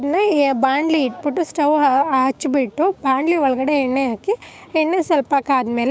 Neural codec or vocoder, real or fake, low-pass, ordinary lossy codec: codec, 16 kHz, 6 kbps, DAC; fake; none; none